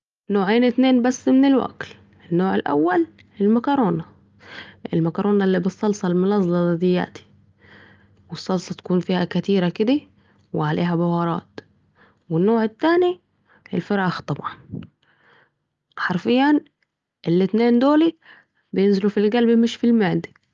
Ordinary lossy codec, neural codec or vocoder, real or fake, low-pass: Opus, 32 kbps; none; real; 7.2 kHz